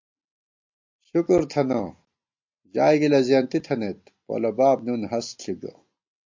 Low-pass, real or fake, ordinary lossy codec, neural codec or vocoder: 7.2 kHz; real; MP3, 48 kbps; none